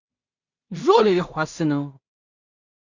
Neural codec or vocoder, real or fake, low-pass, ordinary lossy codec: codec, 16 kHz in and 24 kHz out, 0.4 kbps, LongCat-Audio-Codec, two codebook decoder; fake; 7.2 kHz; Opus, 64 kbps